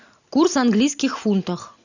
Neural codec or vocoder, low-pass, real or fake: none; 7.2 kHz; real